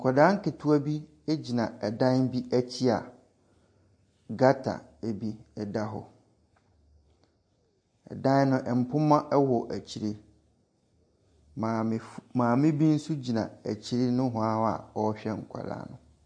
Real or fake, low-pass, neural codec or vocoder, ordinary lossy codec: real; 9.9 kHz; none; MP3, 48 kbps